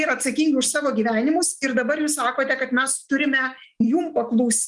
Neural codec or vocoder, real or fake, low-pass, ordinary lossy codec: none; real; 10.8 kHz; Opus, 24 kbps